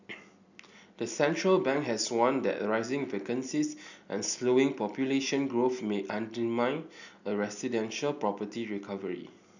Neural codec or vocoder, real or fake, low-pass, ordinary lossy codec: none; real; 7.2 kHz; none